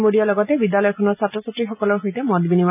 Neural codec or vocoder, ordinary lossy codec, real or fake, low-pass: none; none; real; 3.6 kHz